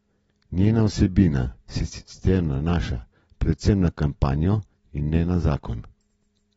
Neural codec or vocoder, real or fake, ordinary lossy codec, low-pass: none; real; AAC, 24 kbps; 19.8 kHz